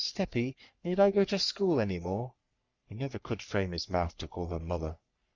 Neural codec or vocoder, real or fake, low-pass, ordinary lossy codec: codec, 44.1 kHz, 3.4 kbps, Pupu-Codec; fake; 7.2 kHz; Opus, 64 kbps